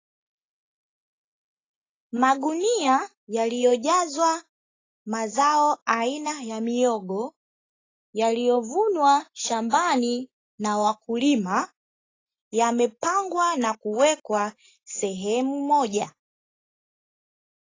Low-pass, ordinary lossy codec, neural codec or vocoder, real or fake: 7.2 kHz; AAC, 32 kbps; none; real